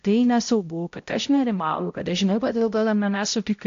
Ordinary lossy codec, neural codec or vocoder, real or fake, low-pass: AAC, 48 kbps; codec, 16 kHz, 0.5 kbps, X-Codec, HuBERT features, trained on balanced general audio; fake; 7.2 kHz